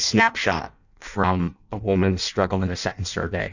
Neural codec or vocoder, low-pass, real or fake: codec, 16 kHz in and 24 kHz out, 0.6 kbps, FireRedTTS-2 codec; 7.2 kHz; fake